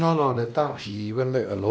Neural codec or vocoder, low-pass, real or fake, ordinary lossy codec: codec, 16 kHz, 1 kbps, X-Codec, WavLM features, trained on Multilingual LibriSpeech; none; fake; none